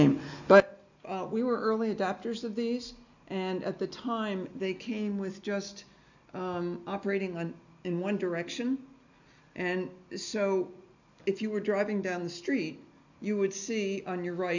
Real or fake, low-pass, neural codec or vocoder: real; 7.2 kHz; none